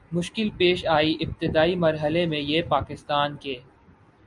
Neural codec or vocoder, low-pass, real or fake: none; 10.8 kHz; real